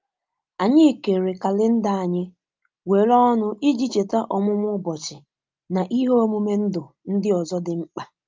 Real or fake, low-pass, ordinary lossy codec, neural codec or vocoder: real; 7.2 kHz; Opus, 24 kbps; none